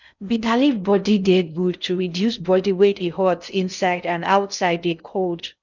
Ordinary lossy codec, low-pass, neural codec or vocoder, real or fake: none; 7.2 kHz; codec, 16 kHz in and 24 kHz out, 0.6 kbps, FocalCodec, streaming, 4096 codes; fake